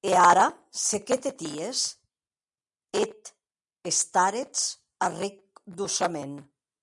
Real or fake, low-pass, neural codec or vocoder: real; 10.8 kHz; none